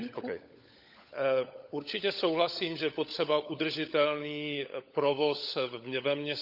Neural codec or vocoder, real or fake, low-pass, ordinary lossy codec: codec, 16 kHz, 16 kbps, FunCodec, trained on LibriTTS, 50 frames a second; fake; 5.4 kHz; none